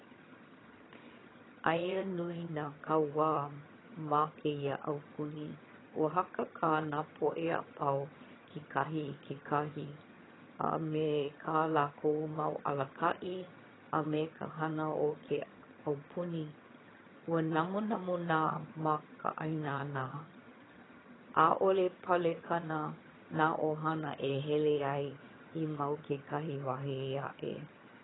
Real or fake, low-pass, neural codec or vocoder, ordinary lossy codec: fake; 7.2 kHz; vocoder, 22.05 kHz, 80 mel bands, HiFi-GAN; AAC, 16 kbps